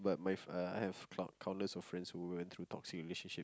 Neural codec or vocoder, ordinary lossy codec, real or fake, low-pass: none; none; real; none